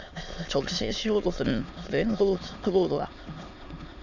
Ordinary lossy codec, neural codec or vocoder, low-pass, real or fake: none; autoencoder, 22.05 kHz, a latent of 192 numbers a frame, VITS, trained on many speakers; 7.2 kHz; fake